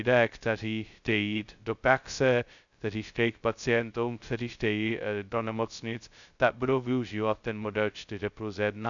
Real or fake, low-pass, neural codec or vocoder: fake; 7.2 kHz; codec, 16 kHz, 0.2 kbps, FocalCodec